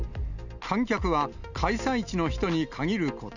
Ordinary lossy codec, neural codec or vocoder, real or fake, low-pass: none; none; real; 7.2 kHz